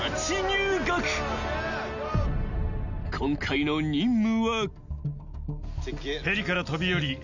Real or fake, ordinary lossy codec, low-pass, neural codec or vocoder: real; none; 7.2 kHz; none